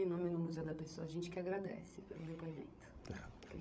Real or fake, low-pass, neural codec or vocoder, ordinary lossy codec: fake; none; codec, 16 kHz, 16 kbps, FreqCodec, larger model; none